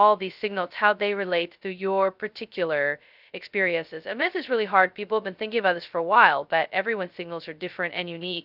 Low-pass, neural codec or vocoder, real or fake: 5.4 kHz; codec, 16 kHz, 0.2 kbps, FocalCodec; fake